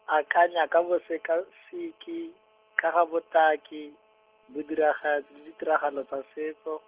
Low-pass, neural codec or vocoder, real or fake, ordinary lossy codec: 3.6 kHz; none; real; Opus, 16 kbps